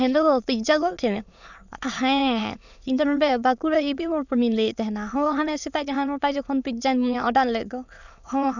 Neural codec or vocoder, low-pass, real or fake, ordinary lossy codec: autoencoder, 22.05 kHz, a latent of 192 numbers a frame, VITS, trained on many speakers; 7.2 kHz; fake; none